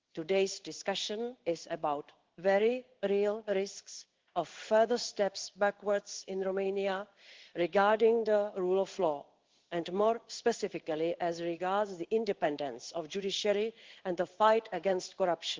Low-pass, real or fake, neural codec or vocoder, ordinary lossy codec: 7.2 kHz; fake; codec, 16 kHz in and 24 kHz out, 1 kbps, XY-Tokenizer; Opus, 16 kbps